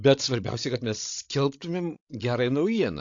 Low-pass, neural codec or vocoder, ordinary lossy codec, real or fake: 7.2 kHz; codec, 16 kHz, 16 kbps, FreqCodec, larger model; AAC, 48 kbps; fake